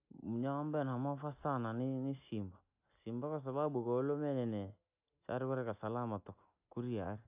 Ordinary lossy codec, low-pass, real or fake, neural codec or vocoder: AAC, 32 kbps; 3.6 kHz; real; none